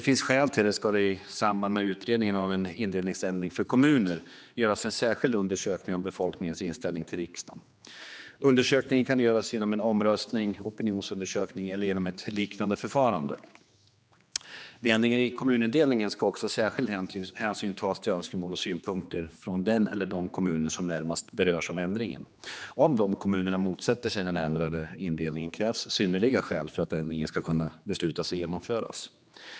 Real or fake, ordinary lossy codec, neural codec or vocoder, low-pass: fake; none; codec, 16 kHz, 2 kbps, X-Codec, HuBERT features, trained on general audio; none